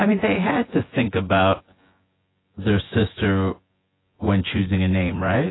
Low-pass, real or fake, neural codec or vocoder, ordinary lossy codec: 7.2 kHz; fake; vocoder, 24 kHz, 100 mel bands, Vocos; AAC, 16 kbps